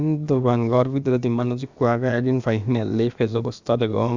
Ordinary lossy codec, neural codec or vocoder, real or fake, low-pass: Opus, 64 kbps; codec, 16 kHz, about 1 kbps, DyCAST, with the encoder's durations; fake; 7.2 kHz